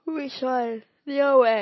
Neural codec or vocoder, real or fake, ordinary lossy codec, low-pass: none; real; MP3, 24 kbps; 7.2 kHz